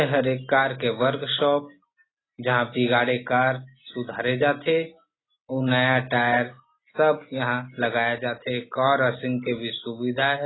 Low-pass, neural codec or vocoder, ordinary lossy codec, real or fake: 7.2 kHz; none; AAC, 16 kbps; real